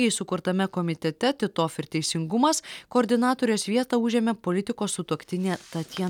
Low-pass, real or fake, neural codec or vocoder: 19.8 kHz; real; none